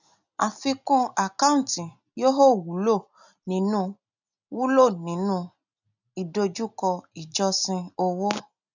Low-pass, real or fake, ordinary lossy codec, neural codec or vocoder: 7.2 kHz; real; none; none